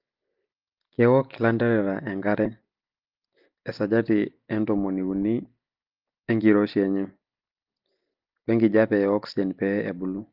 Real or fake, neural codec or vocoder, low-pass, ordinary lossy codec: real; none; 5.4 kHz; Opus, 24 kbps